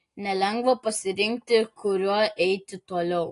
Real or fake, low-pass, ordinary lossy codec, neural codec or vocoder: real; 10.8 kHz; AAC, 48 kbps; none